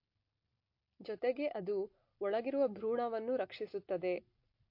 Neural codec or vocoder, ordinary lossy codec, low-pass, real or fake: none; MP3, 32 kbps; 5.4 kHz; real